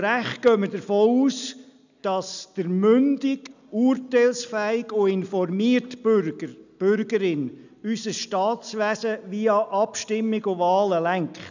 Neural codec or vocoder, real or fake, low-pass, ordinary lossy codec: none; real; 7.2 kHz; none